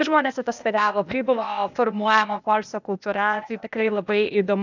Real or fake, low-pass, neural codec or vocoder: fake; 7.2 kHz; codec, 16 kHz, 0.8 kbps, ZipCodec